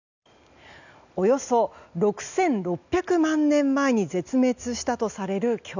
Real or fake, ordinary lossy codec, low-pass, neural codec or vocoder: real; none; 7.2 kHz; none